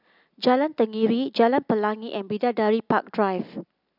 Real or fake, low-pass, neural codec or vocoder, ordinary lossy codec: real; 5.4 kHz; none; none